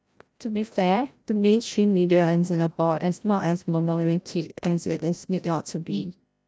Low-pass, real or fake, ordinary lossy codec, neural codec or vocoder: none; fake; none; codec, 16 kHz, 0.5 kbps, FreqCodec, larger model